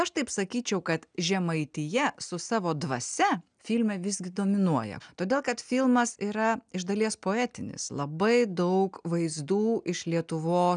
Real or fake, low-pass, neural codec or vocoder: real; 9.9 kHz; none